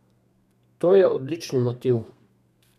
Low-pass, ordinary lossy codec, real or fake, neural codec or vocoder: 14.4 kHz; none; fake; codec, 32 kHz, 1.9 kbps, SNAC